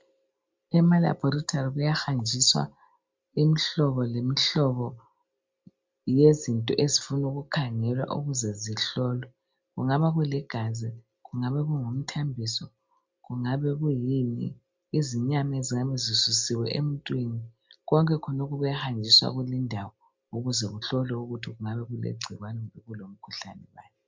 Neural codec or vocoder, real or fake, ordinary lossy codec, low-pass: none; real; MP3, 64 kbps; 7.2 kHz